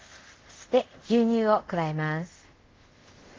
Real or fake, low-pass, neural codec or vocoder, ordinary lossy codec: fake; 7.2 kHz; codec, 24 kHz, 0.5 kbps, DualCodec; Opus, 16 kbps